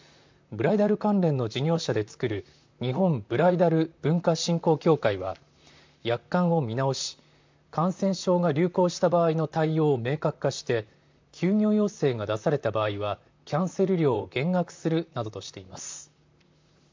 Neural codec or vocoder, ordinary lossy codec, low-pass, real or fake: vocoder, 44.1 kHz, 128 mel bands, Pupu-Vocoder; MP3, 64 kbps; 7.2 kHz; fake